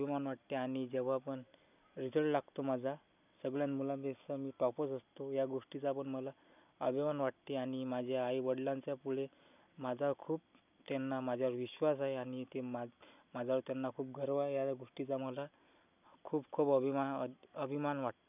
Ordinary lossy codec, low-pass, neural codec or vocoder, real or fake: none; 3.6 kHz; none; real